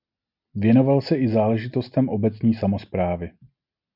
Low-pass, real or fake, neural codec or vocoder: 5.4 kHz; real; none